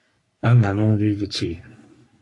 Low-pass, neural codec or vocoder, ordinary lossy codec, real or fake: 10.8 kHz; codec, 44.1 kHz, 3.4 kbps, Pupu-Codec; AAC, 48 kbps; fake